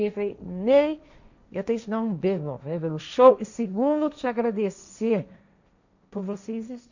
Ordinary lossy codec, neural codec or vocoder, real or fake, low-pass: none; codec, 16 kHz, 1.1 kbps, Voila-Tokenizer; fake; 7.2 kHz